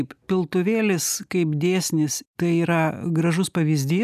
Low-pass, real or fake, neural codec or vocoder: 14.4 kHz; real; none